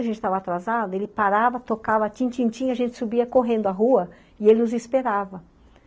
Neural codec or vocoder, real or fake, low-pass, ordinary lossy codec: none; real; none; none